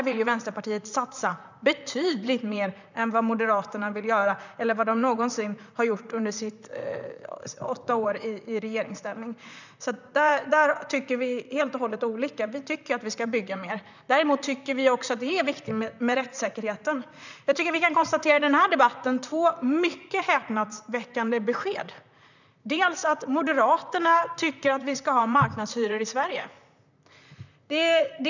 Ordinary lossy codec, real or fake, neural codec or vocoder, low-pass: none; fake; vocoder, 44.1 kHz, 128 mel bands, Pupu-Vocoder; 7.2 kHz